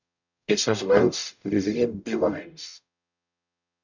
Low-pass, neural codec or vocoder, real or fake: 7.2 kHz; codec, 44.1 kHz, 0.9 kbps, DAC; fake